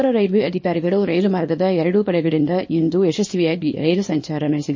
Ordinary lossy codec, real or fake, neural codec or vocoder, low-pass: MP3, 32 kbps; fake; codec, 24 kHz, 0.9 kbps, WavTokenizer, small release; 7.2 kHz